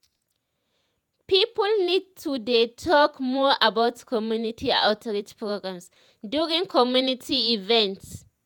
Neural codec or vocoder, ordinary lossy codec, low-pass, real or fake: vocoder, 48 kHz, 128 mel bands, Vocos; none; 19.8 kHz; fake